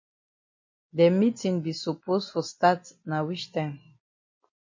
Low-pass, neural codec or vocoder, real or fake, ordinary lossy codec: 7.2 kHz; none; real; MP3, 32 kbps